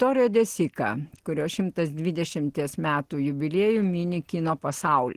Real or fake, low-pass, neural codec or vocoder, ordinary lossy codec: real; 14.4 kHz; none; Opus, 16 kbps